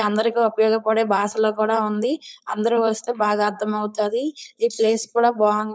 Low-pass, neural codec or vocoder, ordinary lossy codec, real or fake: none; codec, 16 kHz, 4.8 kbps, FACodec; none; fake